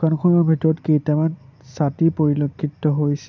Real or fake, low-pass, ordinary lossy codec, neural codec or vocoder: real; 7.2 kHz; none; none